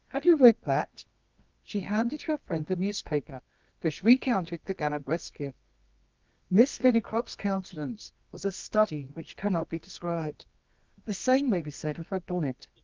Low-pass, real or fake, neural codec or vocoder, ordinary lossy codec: 7.2 kHz; fake; codec, 24 kHz, 0.9 kbps, WavTokenizer, medium music audio release; Opus, 24 kbps